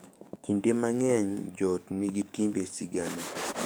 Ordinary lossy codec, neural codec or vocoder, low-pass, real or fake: none; codec, 44.1 kHz, 7.8 kbps, DAC; none; fake